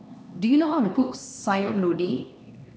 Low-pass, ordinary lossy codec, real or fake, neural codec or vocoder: none; none; fake; codec, 16 kHz, 2 kbps, X-Codec, HuBERT features, trained on LibriSpeech